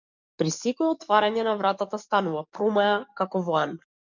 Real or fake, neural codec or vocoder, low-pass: fake; codec, 44.1 kHz, 7.8 kbps, DAC; 7.2 kHz